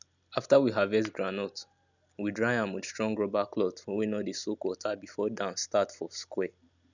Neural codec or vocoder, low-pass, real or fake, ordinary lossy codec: none; 7.2 kHz; real; none